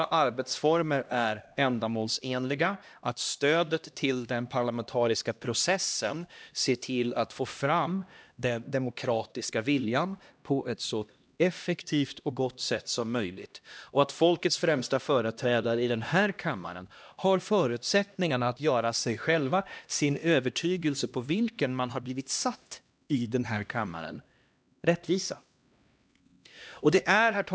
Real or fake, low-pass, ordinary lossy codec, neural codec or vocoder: fake; none; none; codec, 16 kHz, 1 kbps, X-Codec, HuBERT features, trained on LibriSpeech